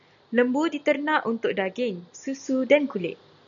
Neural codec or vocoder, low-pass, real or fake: none; 7.2 kHz; real